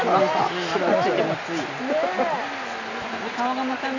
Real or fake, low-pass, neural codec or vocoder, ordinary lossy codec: real; 7.2 kHz; none; none